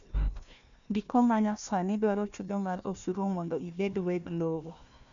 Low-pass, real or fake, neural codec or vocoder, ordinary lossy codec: 7.2 kHz; fake; codec, 16 kHz, 1 kbps, FunCodec, trained on Chinese and English, 50 frames a second; none